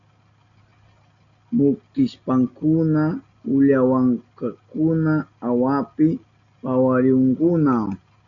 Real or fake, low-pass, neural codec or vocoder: real; 7.2 kHz; none